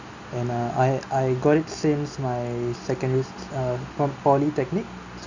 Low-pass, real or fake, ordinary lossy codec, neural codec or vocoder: 7.2 kHz; real; Opus, 64 kbps; none